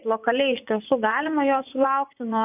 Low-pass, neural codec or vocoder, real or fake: 3.6 kHz; vocoder, 24 kHz, 100 mel bands, Vocos; fake